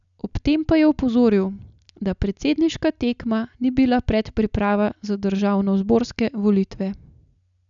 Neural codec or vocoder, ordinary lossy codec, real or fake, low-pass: none; none; real; 7.2 kHz